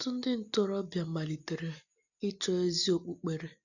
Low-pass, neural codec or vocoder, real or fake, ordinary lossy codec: 7.2 kHz; none; real; none